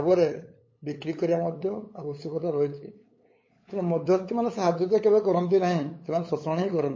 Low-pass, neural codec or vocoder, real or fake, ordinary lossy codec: 7.2 kHz; codec, 16 kHz, 8 kbps, FunCodec, trained on LibriTTS, 25 frames a second; fake; MP3, 32 kbps